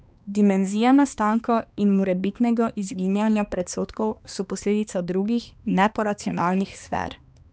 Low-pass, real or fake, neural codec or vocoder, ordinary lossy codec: none; fake; codec, 16 kHz, 2 kbps, X-Codec, HuBERT features, trained on balanced general audio; none